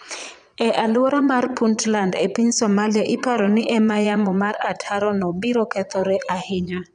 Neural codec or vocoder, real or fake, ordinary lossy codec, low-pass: vocoder, 22.05 kHz, 80 mel bands, Vocos; fake; none; 9.9 kHz